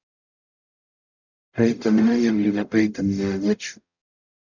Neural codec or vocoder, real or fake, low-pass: codec, 44.1 kHz, 0.9 kbps, DAC; fake; 7.2 kHz